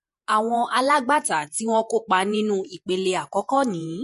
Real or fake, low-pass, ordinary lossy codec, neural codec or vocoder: fake; 14.4 kHz; MP3, 48 kbps; vocoder, 48 kHz, 128 mel bands, Vocos